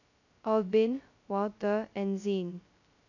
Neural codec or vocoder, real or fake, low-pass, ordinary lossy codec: codec, 16 kHz, 0.2 kbps, FocalCodec; fake; 7.2 kHz; none